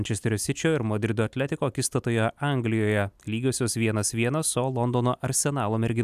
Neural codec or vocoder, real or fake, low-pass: none; real; 14.4 kHz